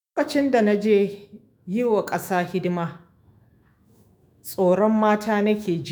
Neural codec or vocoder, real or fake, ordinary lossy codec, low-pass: autoencoder, 48 kHz, 128 numbers a frame, DAC-VAE, trained on Japanese speech; fake; none; none